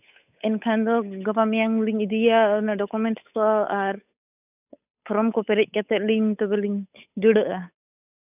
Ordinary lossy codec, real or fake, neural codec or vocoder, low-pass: none; fake; codec, 16 kHz, 8 kbps, FunCodec, trained on Chinese and English, 25 frames a second; 3.6 kHz